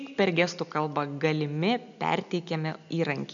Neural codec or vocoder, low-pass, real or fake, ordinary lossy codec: none; 7.2 kHz; real; MP3, 96 kbps